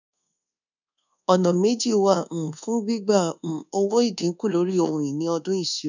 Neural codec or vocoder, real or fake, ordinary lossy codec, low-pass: codec, 24 kHz, 1.2 kbps, DualCodec; fake; none; 7.2 kHz